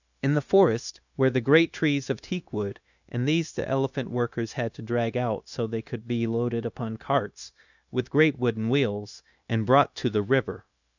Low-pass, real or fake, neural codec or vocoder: 7.2 kHz; fake; codec, 16 kHz, 0.9 kbps, LongCat-Audio-Codec